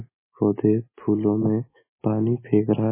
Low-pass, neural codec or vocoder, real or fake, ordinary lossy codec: 3.6 kHz; none; real; MP3, 16 kbps